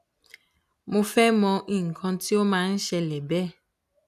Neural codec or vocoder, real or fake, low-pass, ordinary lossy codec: none; real; 14.4 kHz; none